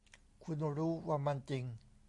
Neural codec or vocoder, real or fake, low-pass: none; real; 10.8 kHz